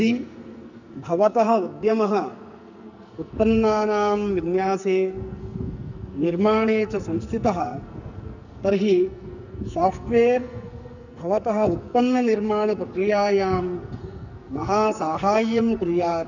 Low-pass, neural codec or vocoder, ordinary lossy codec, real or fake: 7.2 kHz; codec, 44.1 kHz, 2.6 kbps, SNAC; none; fake